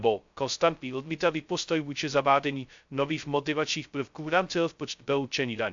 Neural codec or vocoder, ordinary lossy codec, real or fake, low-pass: codec, 16 kHz, 0.2 kbps, FocalCodec; MP3, 64 kbps; fake; 7.2 kHz